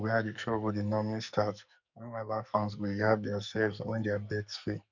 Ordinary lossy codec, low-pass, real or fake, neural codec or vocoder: none; 7.2 kHz; fake; codec, 44.1 kHz, 2.6 kbps, SNAC